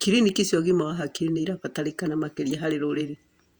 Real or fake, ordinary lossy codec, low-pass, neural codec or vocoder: fake; Opus, 64 kbps; 19.8 kHz; vocoder, 48 kHz, 128 mel bands, Vocos